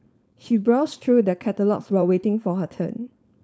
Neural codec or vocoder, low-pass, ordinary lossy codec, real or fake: codec, 16 kHz, 4.8 kbps, FACodec; none; none; fake